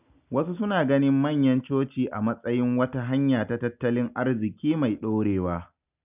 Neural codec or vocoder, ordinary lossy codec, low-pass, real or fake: none; none; 3.6 kHz; real